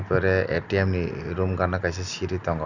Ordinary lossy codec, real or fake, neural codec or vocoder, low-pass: none; real; none; 7.2 kHz